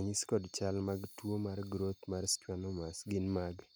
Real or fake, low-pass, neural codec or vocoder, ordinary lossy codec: real; none; none; none